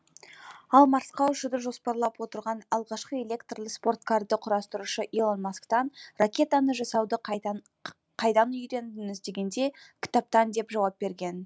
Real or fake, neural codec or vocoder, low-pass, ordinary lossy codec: real; none; none; none